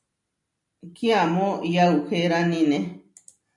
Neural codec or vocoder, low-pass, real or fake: none; 10.8 kHz; real